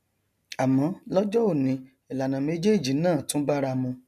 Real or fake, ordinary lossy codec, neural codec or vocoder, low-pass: real; none; none; 14.4 kHz